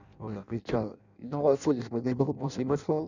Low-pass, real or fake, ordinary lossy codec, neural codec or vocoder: 7.2 kHz; fake; none; codec, 16 kHz in and 24 kHz out, 0.6 kbps, FireRedTTS-2 codec